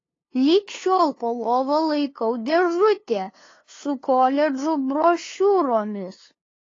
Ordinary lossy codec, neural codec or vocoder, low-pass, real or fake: AAC, 32 kbps; codec, 16 kHz, 2 kbps, FunCodec, trained on LibriTTS, 25 frames a second; 7.2 kHz; fake